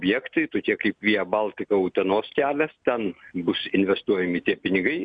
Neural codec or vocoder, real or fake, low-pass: none; real; 9.9 kHz